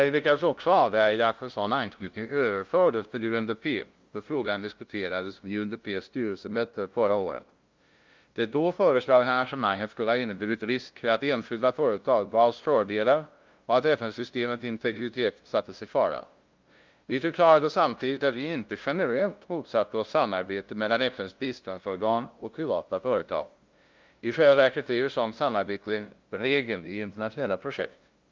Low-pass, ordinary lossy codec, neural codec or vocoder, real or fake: 7.2 kHz; Opus, 24 kbps; codec, 16 kHz, 0.5 kbps, FunCodec, trained on LibriTTS, 25 frames a second; fake